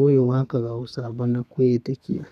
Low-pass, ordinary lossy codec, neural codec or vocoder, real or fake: 14.4 kHz; none; codec, 32 kHz, 1.9 kbps, SNAC; fake